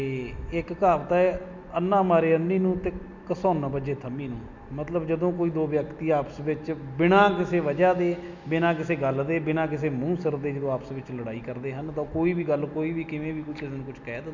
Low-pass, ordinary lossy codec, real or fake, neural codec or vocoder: 7.2 kHz; MP3, 64 kbps; real; none